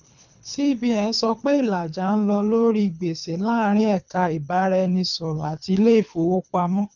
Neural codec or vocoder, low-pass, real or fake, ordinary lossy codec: codec, 24 kHz, 3 kbps, HILCodec; 7.2 kHz; fake; none